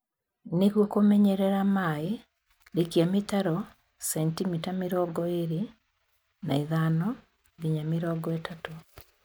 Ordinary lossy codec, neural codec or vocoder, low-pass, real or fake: none; none; none; real